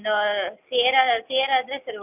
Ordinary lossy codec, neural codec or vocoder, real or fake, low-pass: none; none; real; 3.6 kHz